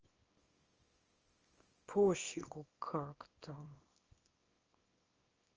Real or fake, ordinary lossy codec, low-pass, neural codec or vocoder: fake; Opus, 24 kbps; 7.2 kHz; codec, 24 kHz, 0.9 kbps, WavTokenizer, small release